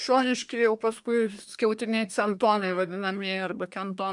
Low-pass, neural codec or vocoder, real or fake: 10.8 kHz; codec, 24 kHz, 1 kbps, SNAC; fake